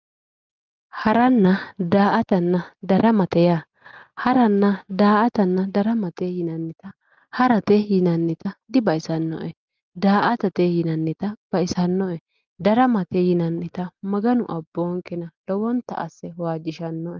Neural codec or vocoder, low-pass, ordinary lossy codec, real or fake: none; 7.2 kHz; Opus, 32 kbps; real